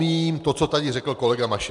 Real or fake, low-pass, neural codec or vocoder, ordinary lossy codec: real; 10.8 kHz; none; MP3, 96 kbps